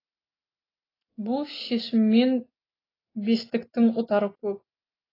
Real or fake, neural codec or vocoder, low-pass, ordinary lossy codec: real; none; 5.4 kHz; AAC, 24 kbps